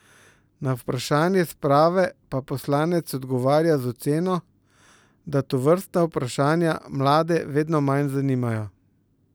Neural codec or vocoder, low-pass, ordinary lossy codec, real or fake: none; none; none; real